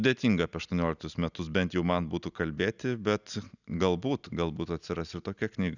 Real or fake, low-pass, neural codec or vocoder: real; 7.2 kHz; none